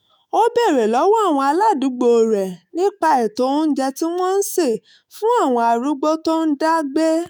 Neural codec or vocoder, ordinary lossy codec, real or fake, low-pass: autoencoder, 48 kHz, 128 numbers a frame, DAC-VAE, trained on Japanese speech; none; fake; none